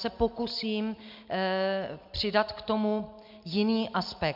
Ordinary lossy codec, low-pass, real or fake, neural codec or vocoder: MP3, 48 kbps; 5.4 kHz; real; none